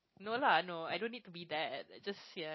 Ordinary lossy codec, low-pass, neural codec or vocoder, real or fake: MP3, 24 kbps; 7.2 kHz; none; real